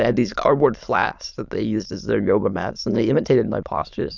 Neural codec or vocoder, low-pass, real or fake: autoencoder, 22.05 kHz, a latent of 192 numbers a frame, VITS, trained on many speakers; 7.2 kHz; fake